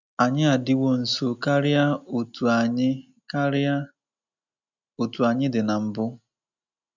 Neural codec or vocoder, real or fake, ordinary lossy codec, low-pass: none; real; none; 7.2 kHz